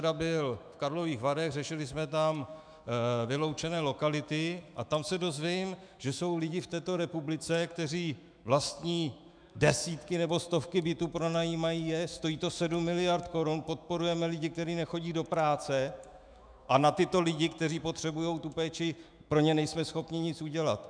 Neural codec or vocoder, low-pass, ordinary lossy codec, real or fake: autoencoder, 48 kHz, 128 numbers a frame, DAC-VAE, trained on Japanese speech; 9.9 kHz; MP3, 96 kbps; fake